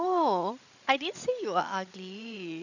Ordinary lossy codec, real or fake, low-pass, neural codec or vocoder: none; fake; 7.2 kHz; codec, 16 kHz, 16 kbps, FreqCodec, larger model